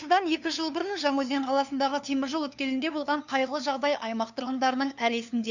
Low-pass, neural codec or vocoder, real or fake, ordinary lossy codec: 7.2 kHz; codec, 16 kHz, 2 kbps, FunCodec, trained on LibriTTS, 25 frames a second; fake; none